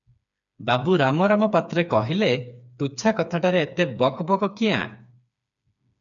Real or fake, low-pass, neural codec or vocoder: fake; 7.2 kHz; codec, 16 kHz, 4 kbps, FreqCodec, smaller model